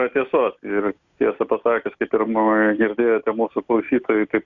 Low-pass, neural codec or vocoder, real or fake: 7.2 kHz; none; real